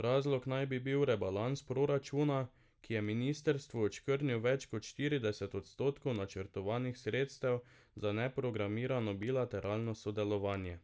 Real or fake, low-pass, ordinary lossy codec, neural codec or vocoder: real; none; none; none